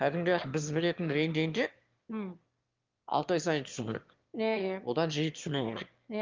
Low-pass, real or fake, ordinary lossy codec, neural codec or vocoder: 7.2 kHz; fake; Opus, 32 kbps; autoencoder, 22.05 kHz, a latent of 192 numbers a frame, VITS, trained on one speaker